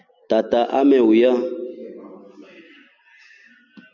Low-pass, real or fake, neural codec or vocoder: 7.2 kHz; real; none